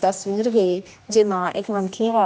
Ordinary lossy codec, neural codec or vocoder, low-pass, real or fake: none; codec, 16 kHz, 1 kbps, X-Codec, HuBERT features, trained on general audio; none; fake